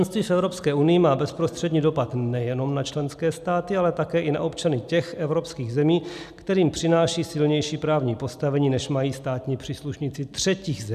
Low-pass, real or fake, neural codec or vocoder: 14.4 kHz; real; none